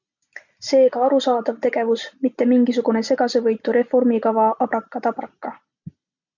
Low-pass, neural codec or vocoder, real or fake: 7.2 kHz; none; real